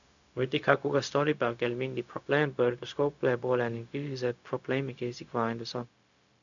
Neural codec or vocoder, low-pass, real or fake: codec, 16 kHz, 0.4 kbps, LongCat-Audio-Codec; 7.2 kHz; fake